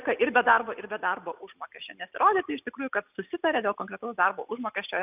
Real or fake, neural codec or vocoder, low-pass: real; none; 3.6 kHz